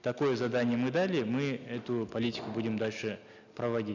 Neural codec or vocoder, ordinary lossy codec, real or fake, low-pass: none; none; real; 7.2 kHz